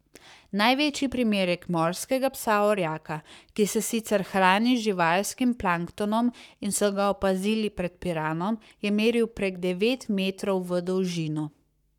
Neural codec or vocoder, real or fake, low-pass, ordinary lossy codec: codec, 44.1 kHz, 7.8 kbps, Pupu-Codec; fake; 19.8 kHz; none